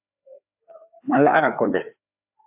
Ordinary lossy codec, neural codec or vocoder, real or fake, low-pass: AAC, 32 kbps; codec, 16 kHz, 2 kbps, FreqCodec, larger model; fake; 3.6 kHz